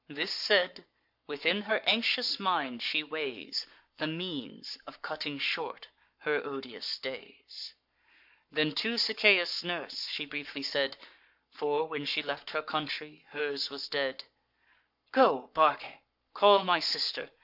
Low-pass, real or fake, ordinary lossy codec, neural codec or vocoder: 5.4 kHz; fake; MP3, 48 kbps; codec, 44.1 kHz, 7.8 kbps, Pupu-Codec